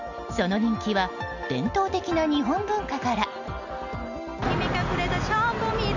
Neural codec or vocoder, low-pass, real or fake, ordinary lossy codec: none; 7.2 kHz; real; none